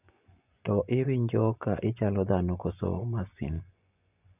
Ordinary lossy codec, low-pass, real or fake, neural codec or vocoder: none; 3.6 kHz; fake; vocoder, 24 kHz, 100 mel bands, Vocos